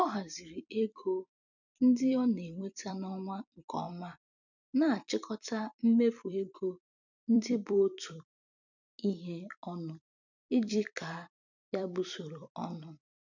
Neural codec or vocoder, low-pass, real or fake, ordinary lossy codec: vocoder, 44.1 kHz, 128 mel bands every 256 samples, BigVGAN v2; 7.2 kHz; fake; none